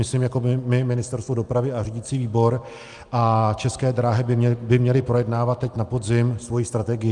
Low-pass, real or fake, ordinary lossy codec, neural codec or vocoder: 10.8 kHz; real; Opus, 32 kbps; none